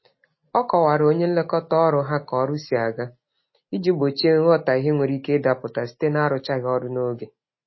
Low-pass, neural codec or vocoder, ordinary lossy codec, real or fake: 7.2 kHz; none; MP3, 24 kbps; real